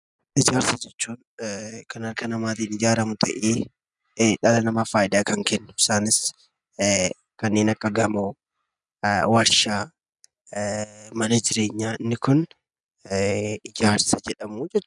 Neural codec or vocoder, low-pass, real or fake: none; 10.8 kHz; real